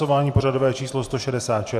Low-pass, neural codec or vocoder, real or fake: 14.4 kHz; vocoder, 44.1 kHz, 128 mel bands every 512 samples, BigVGAN v2; fake